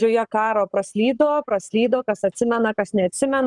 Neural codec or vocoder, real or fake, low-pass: codec, 44.1 kHz, 7.8 kbps, Pupu-Codec; fake; 10.8 kHz